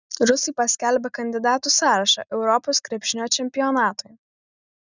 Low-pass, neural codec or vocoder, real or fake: 7.2 kHz; none; real